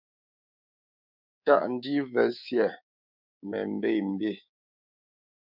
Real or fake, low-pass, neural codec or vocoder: fake; 5.4 kHz; codec, 24 kHz, 3.1 kbps, DualCodec